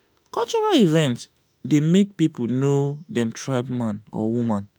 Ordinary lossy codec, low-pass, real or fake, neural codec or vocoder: none; none; fake; autoencoder, 48 kHz, 32 numbers a frame, DAC-VAE, trained on Japanese speech